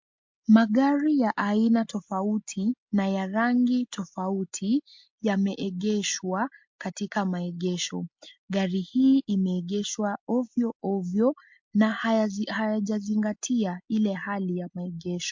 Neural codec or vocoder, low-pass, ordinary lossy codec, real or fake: none; 7.2 kHz; MP3, 48 kbps; real